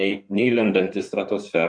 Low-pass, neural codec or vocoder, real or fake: 9.9 kHz; codec, 16 kHz in and 24 kHz out, 2.2 kbps, FireRedTTS-2 codec; fake